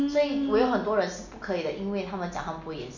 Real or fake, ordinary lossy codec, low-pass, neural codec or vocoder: real; none; 7.2 kHz; none